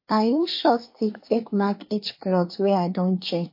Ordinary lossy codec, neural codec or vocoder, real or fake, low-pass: MP3, 32 kbps; codec, 24 kHz, 1 kbps, SNAC; fake; 5.4 kHz